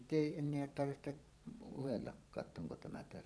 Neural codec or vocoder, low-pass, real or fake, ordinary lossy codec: vocoder, 22.05 kHz, 80 mel bands, Vocos; none; fake; none